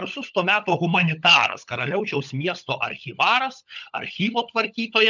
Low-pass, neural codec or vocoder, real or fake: 7.2 kHz; codec, 16 kHz, 16 kbps, FunCodec, trained on LibriTTS, 50 frames a second; fake